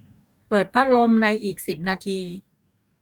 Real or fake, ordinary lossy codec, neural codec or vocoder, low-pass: fake; none; codec, 44.1 kHz, 2.6 kbps, DAC; 19.8 kHz